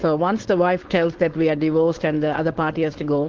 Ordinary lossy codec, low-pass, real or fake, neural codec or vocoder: Opus, 16 kbps; 7.2 kHz; fake; codec, 16 kHz, 4.8 kbps, FACodec